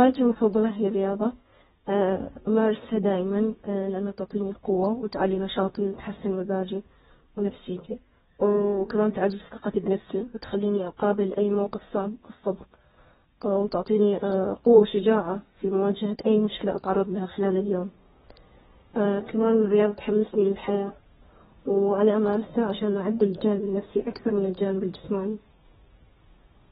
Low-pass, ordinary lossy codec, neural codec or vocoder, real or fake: 14.4 kHz; AAC, 16 kbps; codec, 32 kHz, 1.9 kbps, SNAC; fake